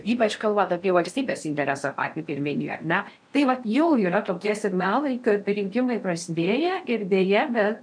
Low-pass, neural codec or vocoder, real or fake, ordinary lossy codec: 9.9 kHz; codec, 16 kHz in and 24 kHz out, 0.6 kbps, FocalCodec, streaming, 4096 codes; fake; MP3, 96 kbps